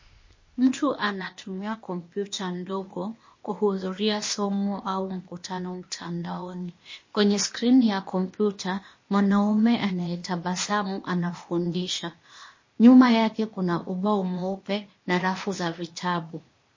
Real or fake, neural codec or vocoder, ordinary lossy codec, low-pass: fake; codec, 16 kHz, 0.8 kbps, ZipCodec; MP3, 32 kbps; 7.2 kHz